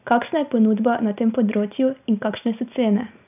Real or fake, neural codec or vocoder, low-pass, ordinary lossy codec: real; none; 3.6 kHz; none